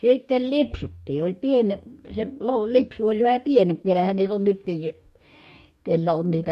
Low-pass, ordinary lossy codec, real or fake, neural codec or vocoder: 14.4 kHz; MP3, 64 kbps; fake; codec, 44.1 kHz, 2.6 kbps, DAC